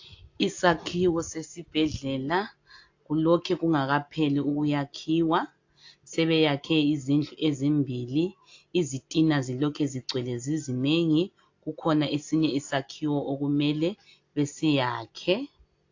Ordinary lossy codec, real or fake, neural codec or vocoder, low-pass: AAC, 48 kbps; real; none; 7.2 kHz